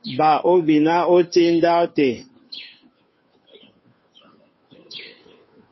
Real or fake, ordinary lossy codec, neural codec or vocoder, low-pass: fake; MP3, 24 kbps; codec, 16 kHz, 2 kbps, FunCodec, trained on Chinese and English, 25 frames a second; 7.2 kHz